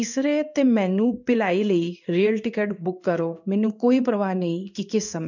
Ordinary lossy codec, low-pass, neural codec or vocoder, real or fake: none; 7.2 kHz; codec, 16 kHz in and 24 kHz out, 1 kbps, XY-Tokenizer; fake